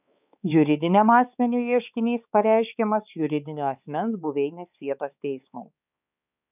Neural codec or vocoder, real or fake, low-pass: codec, 16 kHz, 4 kbps, X-Codec, HuBERT features, trained on balanced general audio; fake; 3.6 kHz